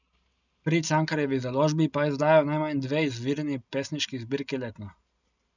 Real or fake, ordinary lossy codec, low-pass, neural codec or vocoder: real; none; 7.2 kHz; none